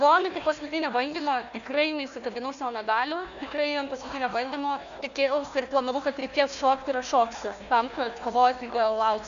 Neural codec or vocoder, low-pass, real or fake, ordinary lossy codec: codec, 16 kHz, 1 kbps, FunCodec, trained on Chinese and English, 50 frames a second; 7.2 kHz; fake; AAC, 96 kbps